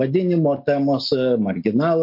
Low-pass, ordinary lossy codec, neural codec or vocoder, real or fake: 5.4 kHz; MP3, 32 kbps; none; real